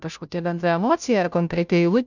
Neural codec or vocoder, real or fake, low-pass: codec, 16 kHz, 0.5 kbps, FunCodec, trained on Chinese and English, 25 frames a second; fake; 7.2 kHz